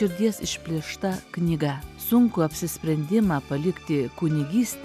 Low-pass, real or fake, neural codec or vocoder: 14.4 kHz; real; none